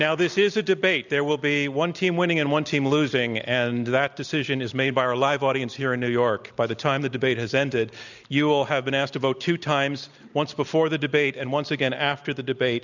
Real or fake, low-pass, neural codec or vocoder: real; 7.2 kHz; none